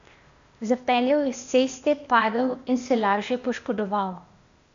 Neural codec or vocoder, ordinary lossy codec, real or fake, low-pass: codec, 16 kHz, 0.8 kbps, ZipCodec; MP3, 64 kbps; fake; 7.2 kHz